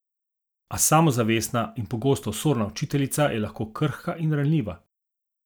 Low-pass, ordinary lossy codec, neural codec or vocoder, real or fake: none; none; none; real